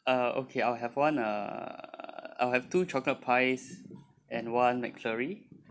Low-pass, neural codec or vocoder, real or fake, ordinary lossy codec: none; none; real; none